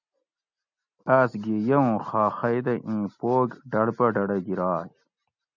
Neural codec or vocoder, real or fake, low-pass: none; real; 7.2 kHz